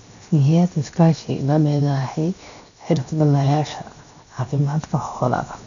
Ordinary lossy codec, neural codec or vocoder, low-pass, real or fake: none; codec, 16 kHz, 0.7 kbps, FocalCodec; 7.2 kHz; fake